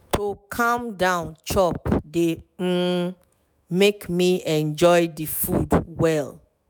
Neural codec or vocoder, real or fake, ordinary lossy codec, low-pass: autoencoder, 48 kHz, 128 numbers a frame, DAC-VAE, trained on Japanese speech; fake; none; none